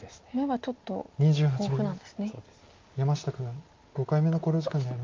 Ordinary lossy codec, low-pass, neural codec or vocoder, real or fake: Opus, 24 kbps; 7.2 kHz; none; real